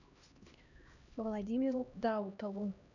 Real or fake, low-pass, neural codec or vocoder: fake; 7.2 kHz; codec, 16 kHz, 1 kbps, X-Codec, HuBERT features, trained on LibriSpeech